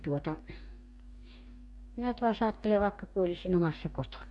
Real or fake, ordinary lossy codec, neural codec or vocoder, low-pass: fake; MP3, 64 kbps; codec, 44.1 kHz, 2.6 kbps, DAC; 10.8 kHz